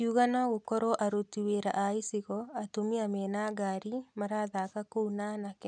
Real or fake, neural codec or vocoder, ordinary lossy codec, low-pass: real; none; none; 9.9 kHz